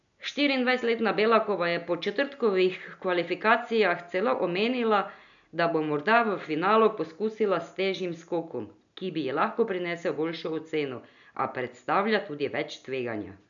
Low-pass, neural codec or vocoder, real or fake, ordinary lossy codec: 7.2 kHz; none; real; none